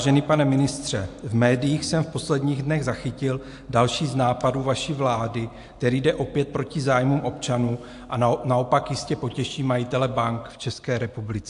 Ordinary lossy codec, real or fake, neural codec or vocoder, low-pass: AAC, 64 kbps; real; none; 10.8 kHz